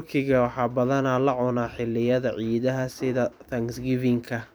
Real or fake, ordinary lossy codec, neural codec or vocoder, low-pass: fake; none; vocoder, 44.1 kHz, 128 mel bands every 256 samples, BigVGAN v2; none